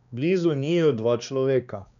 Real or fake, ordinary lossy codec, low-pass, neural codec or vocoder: fake; none; 7.2 kHz; codec, 16 kHz, 2 kbps, X-Codec, HuBERT features, trained on balanced general audio